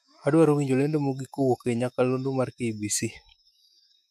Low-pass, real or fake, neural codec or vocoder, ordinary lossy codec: 14.4 kHz; fake; autoencoder, 48 kHz, 128 numbers a frame, DAC-VAE, trained on Japanese speech; none